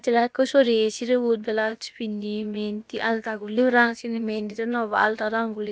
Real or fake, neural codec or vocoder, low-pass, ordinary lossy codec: fake; codec, 16 kHz, about 1 kbps, DyCAST, with the encoder's durations; none; none